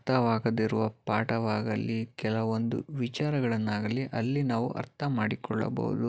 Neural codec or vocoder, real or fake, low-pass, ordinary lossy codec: none; real; none; none